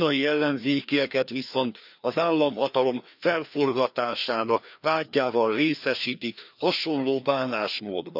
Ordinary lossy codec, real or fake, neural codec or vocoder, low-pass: none; fake; codec, 16 kHz, 2 kbps, FreqCodec, larger model; 5.4 kHz